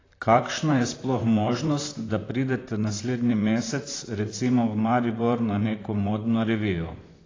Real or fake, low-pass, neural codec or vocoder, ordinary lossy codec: fake; 7.2 kHz; vocoder, 44.1 kHz, 128 mel bands, Pupu-Vocoder; AAC, 32 kbps